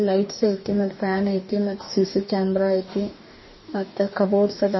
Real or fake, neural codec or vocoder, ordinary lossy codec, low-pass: fake; codec, 44.1 kHz, 2.6 kbps, DAC; MP3, 24 kbps; 7.2 kHz